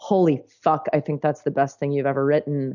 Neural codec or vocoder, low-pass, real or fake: none; 7.2 kHz; real